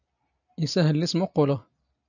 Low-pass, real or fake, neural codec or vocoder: 7.2 kHz; real; none